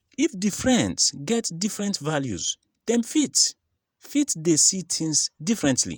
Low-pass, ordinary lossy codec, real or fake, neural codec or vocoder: none; none; fake; vocoder, 48 kHz, 128 mel bands, Vocos